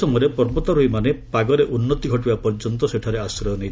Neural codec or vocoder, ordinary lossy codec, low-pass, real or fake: none; none; none; real